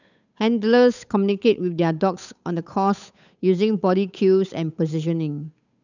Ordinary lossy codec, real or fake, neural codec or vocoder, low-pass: none; fake; codec, 16 kHz, 8 kbps, FunCodec, trained on Chinese and English, 25 frames a second; 7.2 kHz